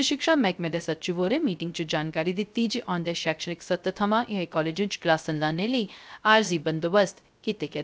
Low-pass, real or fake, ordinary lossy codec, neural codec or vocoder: none; fake; none; codec, 16 kHz, 0.3 kbps, FocalCodec